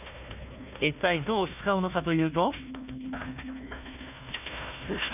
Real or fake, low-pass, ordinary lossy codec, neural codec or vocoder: fake; 3.6 kHz; none; codec, 16 kHz, 1 kbps, FunCodec, trained on Chinese and English, 50 frames a second